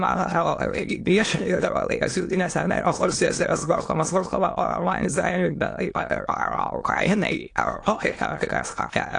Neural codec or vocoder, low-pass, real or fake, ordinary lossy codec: autoencoder, 22.05 kHz, a latent of 192 numbers a frame, VITS, trained on many speakers; 9.9 kHz; fake; AAC, 48 kbps